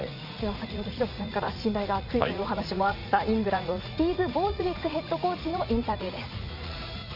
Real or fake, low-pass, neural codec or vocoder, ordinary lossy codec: fake; 5.4 kHz; vocoder, 44.1 kHz, 80 mel bands, Vocos; none